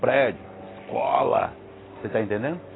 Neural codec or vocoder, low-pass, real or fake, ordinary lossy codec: none; 7.2 kHz; real; AAC, 16 kbps